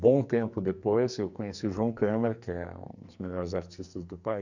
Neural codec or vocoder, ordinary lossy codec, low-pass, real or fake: codec, 44.1 kHz, 2.6 kbps, SNAC; none; 7.2 kHz; fake